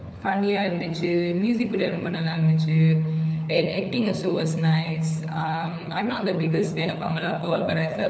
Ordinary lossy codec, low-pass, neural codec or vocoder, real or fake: none; none; codec, 16 kHz, 4 kbps, FunCodec, trained on LibriTTS, 50 frames a second; fake